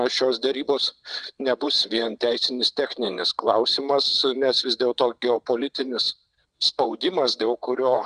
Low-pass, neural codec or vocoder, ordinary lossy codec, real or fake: 9.9 kHz; vocoder, 22.05 kHz, 80 mel bands, WaveNeXt; Opus, 64 kbps; fake